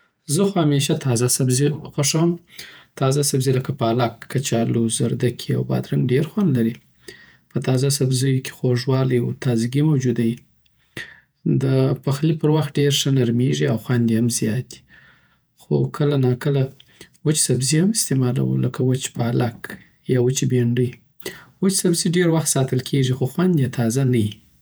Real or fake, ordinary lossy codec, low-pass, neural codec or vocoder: fake; none; none; vocoder, 48 kHz, 128 mel bands, Vocos